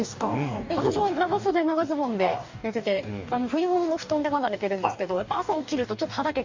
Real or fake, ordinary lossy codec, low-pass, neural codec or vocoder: fake; MP3, 64 kbps; 7.2 kHz; codec, 44.1 kHz, 2.6 kbps, DAC